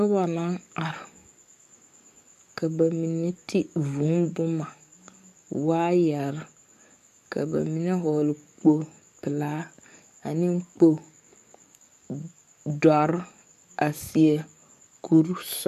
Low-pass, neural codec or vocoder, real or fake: 14.4 kHz; codec, 44.1 kHz, 7.8 kbps, DAC; fake